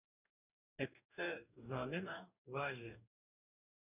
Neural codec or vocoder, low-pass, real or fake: codec, 44.1 kHz, 2.6 kbps, DAC; 3.6 kHz; fake